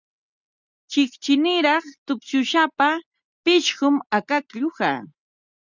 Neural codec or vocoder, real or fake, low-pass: none; real; 7.2 kHz